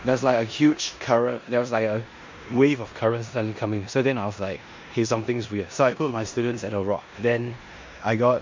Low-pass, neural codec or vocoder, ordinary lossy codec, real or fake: 7.2 kHz; codec, 16 kHz in and 24 kHz out, 0.9 kbps, LongCat-Audio-Codec, four codebook decoder; MP3, 48 kbps; fake